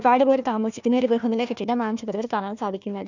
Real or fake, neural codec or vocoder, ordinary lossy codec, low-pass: fake; codec, 16 kHz, 1 kbps, FunCodec, trained on Chinese and English, 50 frames a second; none; 7.2 kHz